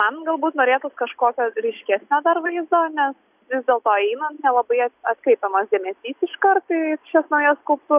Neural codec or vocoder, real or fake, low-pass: none; real; 3.6 kHz